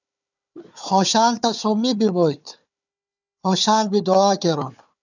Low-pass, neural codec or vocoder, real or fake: 7.2 kHz; codec, 16 kHz, 4 kbps, FunCodec, trained on Chinese and English, 50 frames a second; fake